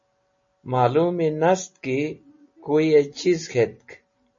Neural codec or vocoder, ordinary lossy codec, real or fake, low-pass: none; MP3, 32 kbps; real; 7.2 kHz